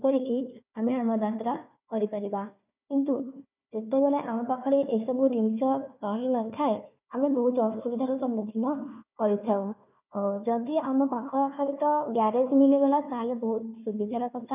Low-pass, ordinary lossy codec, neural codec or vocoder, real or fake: 3.6 kHz; none; codec, 16 kHz, 1 kbps, FunCodec, trained on Chinese and English, 50 frames a second; fake